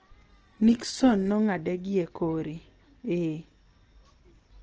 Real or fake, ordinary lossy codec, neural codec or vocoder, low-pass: real; Opus, 16 kbps; none; 7.2 kHz